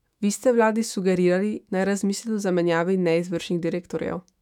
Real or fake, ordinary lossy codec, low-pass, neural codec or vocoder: fake; none; 19.8 kHz; autoencoder, 48 kHz, 128 numbers a frame, DAC-VAE, trained on Japanese speech